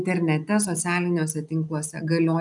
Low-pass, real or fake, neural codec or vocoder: 10.8 kHz; real; none